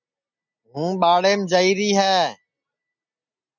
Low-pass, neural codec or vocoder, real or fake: 7.2 kHz; none; real